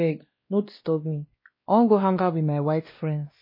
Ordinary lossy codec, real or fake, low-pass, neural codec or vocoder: MP3, 24 kbps; fake; 5.4 kHz; codec, 16 kHz, 1 kbps, X-Codec, WavLM features, trained on Multilingual LibriSpeech